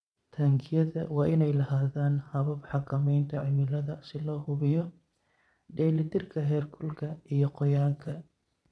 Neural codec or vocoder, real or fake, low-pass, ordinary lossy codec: vocoder, 22.05 kHz, 80 mel bands, Vocos; fake; none; none